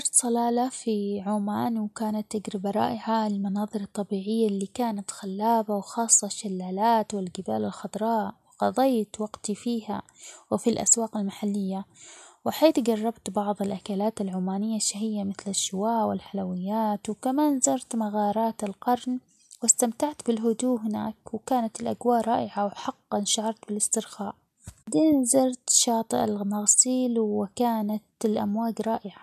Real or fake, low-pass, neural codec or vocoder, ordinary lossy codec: real; 14.4 kHz; none; MP3, 96 kbps